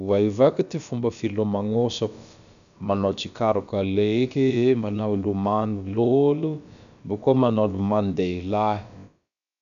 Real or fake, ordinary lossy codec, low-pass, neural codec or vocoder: fake; none; 7.2 kHz; codec, 16 kHz, about 1 kbps, DyCAST, with the encoder's durations